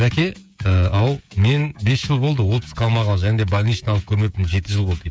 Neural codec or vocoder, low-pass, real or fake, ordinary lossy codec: codec, 16 kHz, 16 kbps, FreqCodec, smaller model; none; fake; none